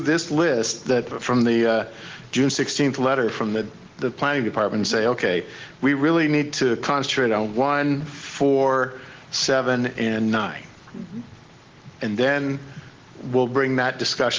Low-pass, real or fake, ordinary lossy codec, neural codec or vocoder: 7.2 kHz; real; Opus, 16 kbps; none